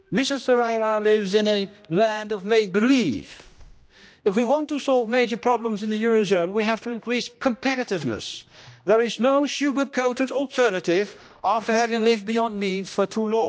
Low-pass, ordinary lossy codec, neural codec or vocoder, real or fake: none; none; codec, 16 kHz, 1 kbps, X-Codec, HuBERT features, trained on general audio; fake